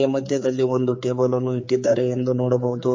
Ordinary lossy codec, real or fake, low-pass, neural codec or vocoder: MP3, 32 kbps; fake; 7.2 kHz; codec, 16 kHz, 4 kbps, X-Codec, HuBERT features, trained on general audio